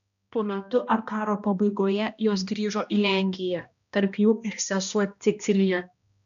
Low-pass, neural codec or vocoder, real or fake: 7.2 kHz; codec, 16 kHz, 1 kbps, X-Codec, HuBERT features, trained on balanced general audio; fake